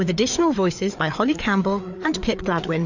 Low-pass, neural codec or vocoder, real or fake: 7.2 kHz; codec, 16 kHz, 4 kbps, FreqCodec, larger model; fake